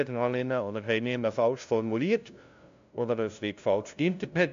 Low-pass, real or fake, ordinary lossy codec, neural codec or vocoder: 7.2 kHz; fake; AAC, 64 kbps; codec, 16 kHz, 0.5 kbps, FunCodec, trained on LibriTTS, 25 frames a second